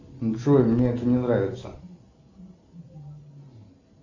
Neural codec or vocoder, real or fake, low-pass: none; real; 7.2 kHz